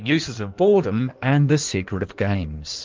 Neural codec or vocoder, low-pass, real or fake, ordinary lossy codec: codec, 16 kHz in and 24 kHz out, 1.1 kbps, FireRedTTS-2 codec; 7.2 kHz; fake; Opus, 32 kbps